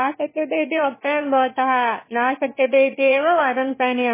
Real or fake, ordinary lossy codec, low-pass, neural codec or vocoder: fake; MP3, 16 kbps; 3.6 kHz; autoencoder, 22.05 kHz, a latent of 192 numbers a frame, VITS, trained on one speaker